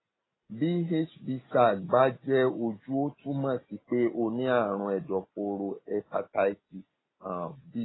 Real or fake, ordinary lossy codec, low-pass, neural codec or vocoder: real; AAC, 16 kbps; 7.2 kHz; none